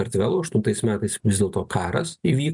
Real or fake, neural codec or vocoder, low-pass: real; none; 10.8 kHz